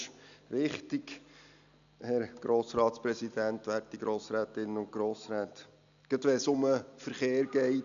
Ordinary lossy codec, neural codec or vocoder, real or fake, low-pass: none; none; real; 7.2 kHz